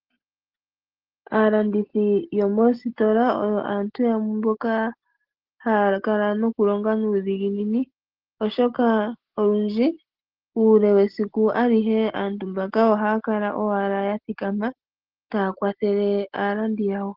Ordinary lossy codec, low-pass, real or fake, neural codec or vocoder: Opus, 16 kbps; 5.4 kHz; real; none